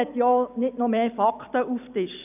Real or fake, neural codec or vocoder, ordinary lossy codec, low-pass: real; none; none; 3.6 kHz